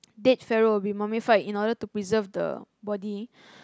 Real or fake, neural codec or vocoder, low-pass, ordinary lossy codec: real; none; none; none